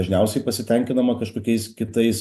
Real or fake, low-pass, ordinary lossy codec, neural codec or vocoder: real; 14.4 kHz; AAC, 96 kbps; none